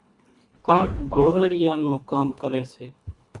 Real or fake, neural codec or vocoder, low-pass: fake; codec, 24 kHz, 1.5 kbps, HILCodec; 10.8 kHz